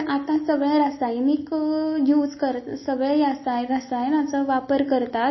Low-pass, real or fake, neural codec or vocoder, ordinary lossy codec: 7.2 kHz; real; none; MP3, 24 kbps